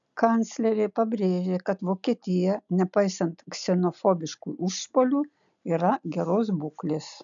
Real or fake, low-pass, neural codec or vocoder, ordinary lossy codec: real; 7.2 kHz; none; MP3, 96 kbps